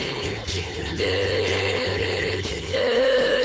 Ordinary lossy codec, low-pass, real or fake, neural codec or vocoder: none; none; fake; codec, 16 kHz, 4.8 kbps, FACodec